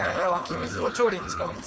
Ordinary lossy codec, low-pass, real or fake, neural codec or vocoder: none; none; fake; codec, 16 kHz, 4.8 kbps, FACodec